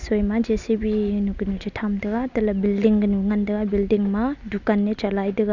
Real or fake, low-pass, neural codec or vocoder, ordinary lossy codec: real; 7.2 kHz; none; none